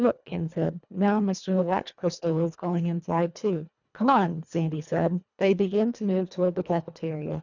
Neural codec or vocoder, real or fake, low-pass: codec, 24 kHz, 1.5 kbps, HILCodec; fake; 7.2 kHz